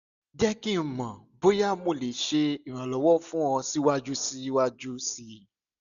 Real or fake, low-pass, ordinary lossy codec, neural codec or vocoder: real; 7.2 kHz; none; none